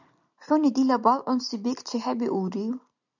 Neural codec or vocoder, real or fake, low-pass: none; real; 7.2 kHz